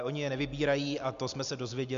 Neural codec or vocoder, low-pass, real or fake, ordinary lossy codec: none; 7.2 kHz; real; MP3, 64 kbps